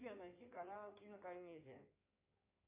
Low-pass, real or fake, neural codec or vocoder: 3.6 kHz; fake; codec, 16 kHz in and 24 kHz out, 2.2 kbps, FireRedTTS-2 codec